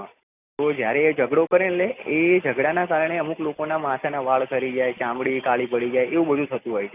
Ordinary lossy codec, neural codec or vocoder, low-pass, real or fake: none; none; 3.6 kHz; real